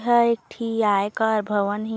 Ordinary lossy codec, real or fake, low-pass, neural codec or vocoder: none; real; none; none